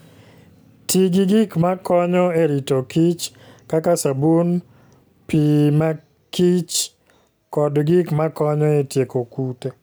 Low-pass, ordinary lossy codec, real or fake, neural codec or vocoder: none; none; real; none